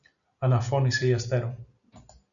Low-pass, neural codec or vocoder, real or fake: 7.2 kHz; none; real